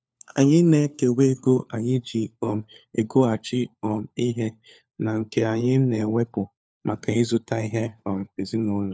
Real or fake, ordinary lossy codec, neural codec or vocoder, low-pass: fake; none; codec, 16 kHz, 4 kbps, FunCodec, trained on LibriTTS, 50 frames a second; none